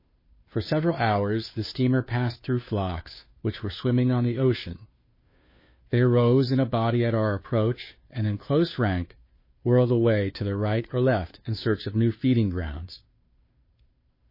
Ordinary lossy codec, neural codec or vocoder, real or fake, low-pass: MP3, 24 kbps; codec, 16 kHz, 2 kbps, FunCodec, trained on Chinese and English, 25 frames a second; fake; 5.4 kHz